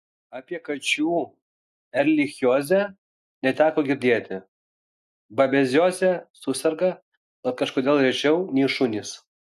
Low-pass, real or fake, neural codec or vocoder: 14.4 kHz; real; none